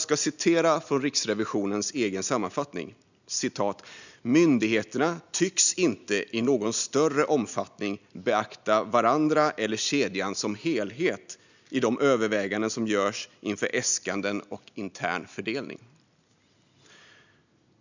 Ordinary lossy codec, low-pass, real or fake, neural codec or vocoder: none; 7.2 kHz; real; none